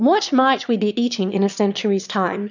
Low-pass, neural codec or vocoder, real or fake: 7.2 kHz; autoencoder, 22.05 kHz, a latent of 192 numbers a frame, VITS, trained on one speaker; fake